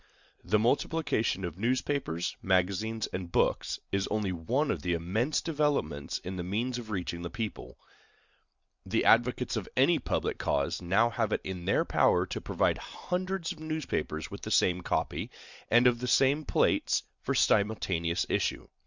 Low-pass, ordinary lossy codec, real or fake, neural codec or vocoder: 7.2 kHz; Opus, 64 kbps; real; none